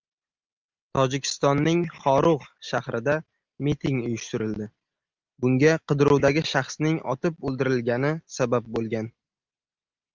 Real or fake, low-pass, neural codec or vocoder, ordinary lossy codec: real; 7.2 kHz; none; Opus, 24 kbps